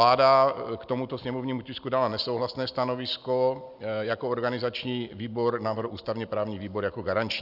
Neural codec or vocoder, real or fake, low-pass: none; real; 5.4 kHz